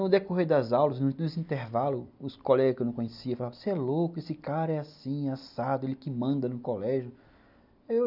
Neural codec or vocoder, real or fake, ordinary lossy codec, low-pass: none; real; none; 5.4 kHz